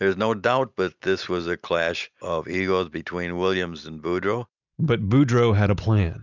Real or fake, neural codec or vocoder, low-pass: real; none; 7.2 kHz